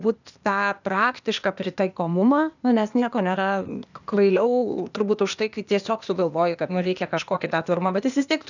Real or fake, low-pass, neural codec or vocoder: fake; 7.2 kHz; codec, 16 kHz, 0.8 kbps, ZipCodec